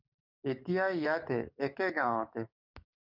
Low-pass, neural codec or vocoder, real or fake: 5.4 kHz; codec, 44.1 kHz, 7.8 kbps, DAC; fake